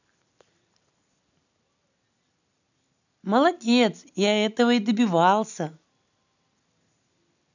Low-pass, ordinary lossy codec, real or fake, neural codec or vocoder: 7.2 kHz; none; real; none